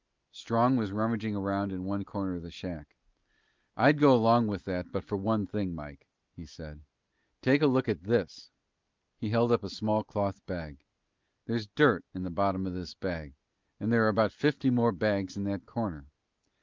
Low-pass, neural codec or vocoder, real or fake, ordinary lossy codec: 7.2 kHz; none; real; Opus, 32 kbps